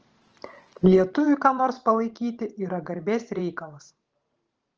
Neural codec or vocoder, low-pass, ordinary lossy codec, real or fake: vocoder, 44.1 kHz, 128 mel bands every 512 samples, BigVGAN v2; 7.2 kHz; Opus, 16 kbps; fake